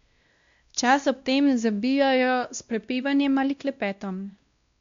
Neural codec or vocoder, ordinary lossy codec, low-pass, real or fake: codec, 16 kHz, 1 kbps, X-Codec, WavLM features, trained on Multilingual LibriSpeech; MP3, 64 kbps; 7.2 kHz; fake